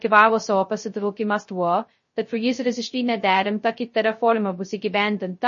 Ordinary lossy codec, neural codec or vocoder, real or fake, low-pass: MP3, 32 kbps; codec, 16 kHz, 0.2 kbps, FocalCodec; fake; 7.2 kHz